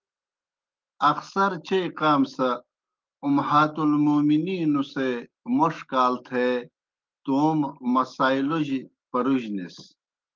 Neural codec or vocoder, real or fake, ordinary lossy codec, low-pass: autoencoder, 48 kHz, 128 numbers a frame, DAC-VAE, trained on Japanese speech; fake; Opus, 24 kbps; 7.2 kHz